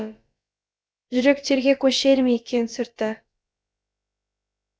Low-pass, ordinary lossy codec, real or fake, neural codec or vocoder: none; none; fake; codec, 16 kHz, about 1 kbps, DyCAST, with the encoder's durations